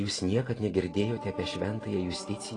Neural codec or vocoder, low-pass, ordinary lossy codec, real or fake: none; 10.8 kHz; AAC, 32 kbps; real